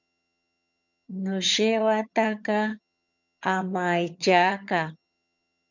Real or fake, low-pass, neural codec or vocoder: fake; 7.2 kHz; vocoder, 22.05 kHz, 80 mel bands, HiFi-GAN